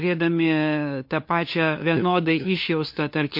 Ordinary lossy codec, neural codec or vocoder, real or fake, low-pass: MP3, 32 kbps; codec, 16 kHz, 2 kbps, FunCodec, trained on LibriTTS, 25 frames a second; fake; 5.4 kHz